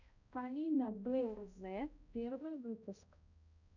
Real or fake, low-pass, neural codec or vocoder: fake; 7.2 kHz; codec, 16 kHz, 0.5 kbps, X-Codec, HuBERT features, trained on balanced general audio